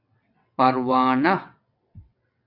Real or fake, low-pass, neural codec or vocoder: fake; 5.4 kHz; vocoder, 22.05 kHz, 80 mel bands, WaveNeXt